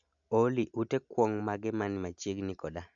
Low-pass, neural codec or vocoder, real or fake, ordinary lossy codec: 7.2 kHz; none; real; none